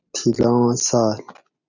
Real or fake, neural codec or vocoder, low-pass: real; none; 7.2 kHz